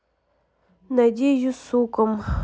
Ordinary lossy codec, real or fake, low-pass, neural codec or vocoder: none; real; none; none